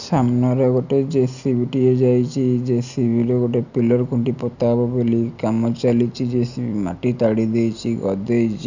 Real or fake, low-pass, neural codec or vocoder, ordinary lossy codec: real; 7.2 kHz; none; none